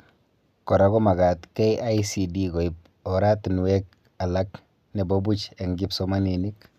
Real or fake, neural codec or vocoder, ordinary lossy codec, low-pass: real; none; none; 14.4 kHz